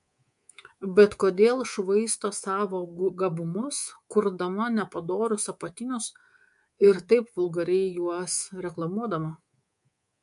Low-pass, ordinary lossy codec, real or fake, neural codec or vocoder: 10.8 kHz; MP3, 64 kbps; fake; codec, 24 kHz, 3.1 kbps, DualCodec